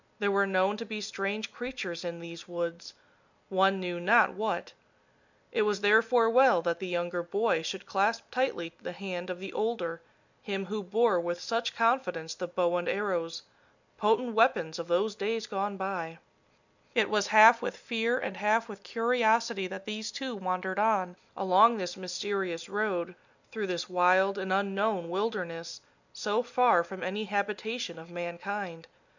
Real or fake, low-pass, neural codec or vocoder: real; 7.2 kHz; none